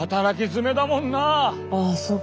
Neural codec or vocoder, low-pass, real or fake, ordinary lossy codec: none; none; real; none